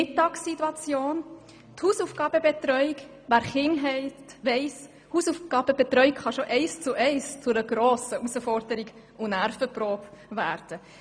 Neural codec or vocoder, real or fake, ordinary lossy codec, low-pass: none; real; none; 9.9 kHz